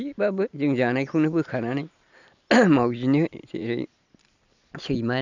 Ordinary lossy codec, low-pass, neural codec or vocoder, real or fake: none; 7.2 kHz; none; real